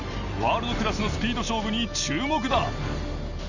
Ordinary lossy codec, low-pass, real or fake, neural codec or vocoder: none; 7.2 kHz; real; none